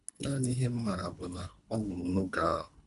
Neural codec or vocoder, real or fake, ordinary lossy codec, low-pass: codec, 24 kHz, 3 kbps, HILCodec; fake; none; 10.8 kHz